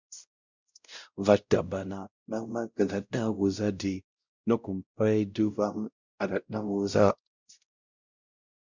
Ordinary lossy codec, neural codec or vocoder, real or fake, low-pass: Opus, 64 kbps; codec, 16 kHz, 0.5 kbps, X-Codec, WavLM features, trained on Multilingual LibriSpeech; fake; 7.2 kHz